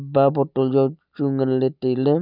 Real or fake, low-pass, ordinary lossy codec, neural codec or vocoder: real; 5.4 kHz; none; none